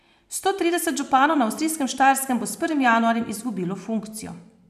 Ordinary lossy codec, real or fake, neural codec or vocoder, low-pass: none; fake; vocoder, 44.1 kHz, 128 mel bands every 512 samples, BigVGAN v2; 14.4 kHz